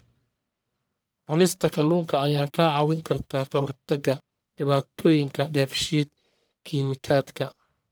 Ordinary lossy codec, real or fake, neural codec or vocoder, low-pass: none; fake; codec, 44.1 kHz, 1.7 kbps, Pupu-Codec; none